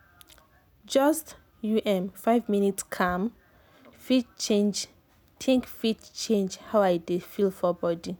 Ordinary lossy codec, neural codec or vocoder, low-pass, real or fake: none; none; none; real